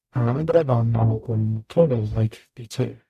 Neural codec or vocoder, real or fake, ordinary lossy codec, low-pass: codec, 44.1 kHz, 0.9 kbps, DAC; fake; MP3, 96 kbps; 14.4 kHz